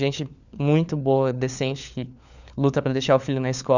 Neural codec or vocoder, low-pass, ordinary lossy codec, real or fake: codec, 16 kHz, 4 kbps, FunCodec, trained on LibriTTS, 50 frames a second; 7.2 kHz; none; fake